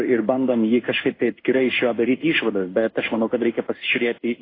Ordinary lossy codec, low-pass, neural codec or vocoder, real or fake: AAC, 24 kbps; 5.4 kHz; codec, 16 kHz in and 24 kHz out, 1 kbps, XY-Tokenizer; fake